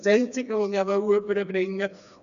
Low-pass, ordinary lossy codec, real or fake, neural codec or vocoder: 7.2 kHz; none; fake; codec, 16 kHz, 2 kbps, FreqCodec, smaller model